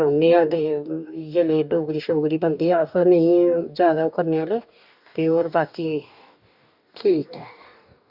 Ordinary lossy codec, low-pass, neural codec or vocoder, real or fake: none; 5.4 kHz; codec, 44.1 kHz, 2.6 kbps, DAC; fake